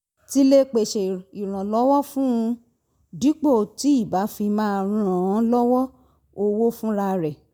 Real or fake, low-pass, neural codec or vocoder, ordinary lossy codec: real; none; none; none